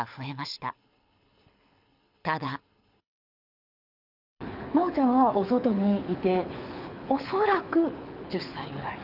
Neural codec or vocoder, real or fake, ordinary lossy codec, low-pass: codec, 24 kHz, 6 kbps, HILCodec; fake; none; 5.4 kHz